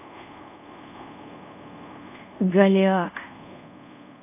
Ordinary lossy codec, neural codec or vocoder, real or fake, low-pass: none; codec, 24 kHz, 0.5 kbps, DualCodec; fake; 3.6 kHz